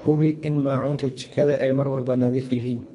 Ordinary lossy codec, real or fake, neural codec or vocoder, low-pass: MP3, 64 kbps; fake; codec, 24 kHz, 1.5 kbps, HILCodec; 10.8 kHz